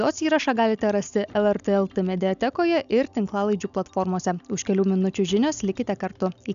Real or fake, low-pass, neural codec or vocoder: real; 7.2 kHz; none